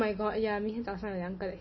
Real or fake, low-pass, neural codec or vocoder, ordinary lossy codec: real; 7.2 kHz; none; MP3, 24 kbps